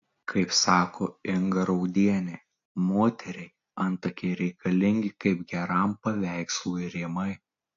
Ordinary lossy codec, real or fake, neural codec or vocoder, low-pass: AAC, 48 kbps; real; none; 7.2 kHz